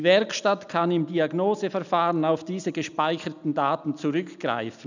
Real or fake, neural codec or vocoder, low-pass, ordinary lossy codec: real; none; 7.2 kHz; none